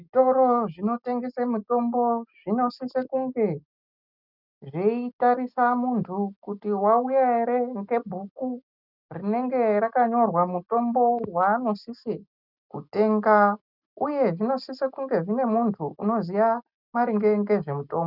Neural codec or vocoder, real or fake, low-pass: none; real; 5.4 kHz